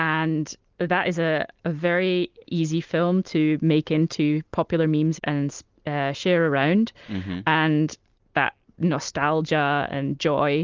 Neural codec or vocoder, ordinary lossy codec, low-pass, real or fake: none; Opus, 24 kbps; 7.2 kHz; real